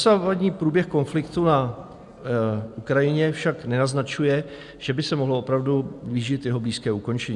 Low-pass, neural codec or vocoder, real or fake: 10.8 kHz; none; real